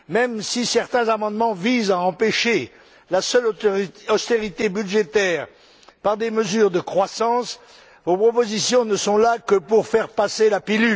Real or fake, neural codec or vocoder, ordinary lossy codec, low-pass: real; none; none; none